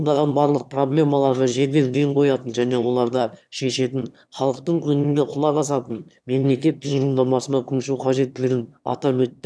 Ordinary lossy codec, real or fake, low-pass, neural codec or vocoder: none; fake; none; autoencoder, 22.05 kHz, a latent of 192 numbers a frame, VITS, trained on one speaker